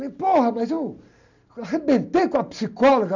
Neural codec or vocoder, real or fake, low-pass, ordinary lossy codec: none; real; 7.2 kHz; none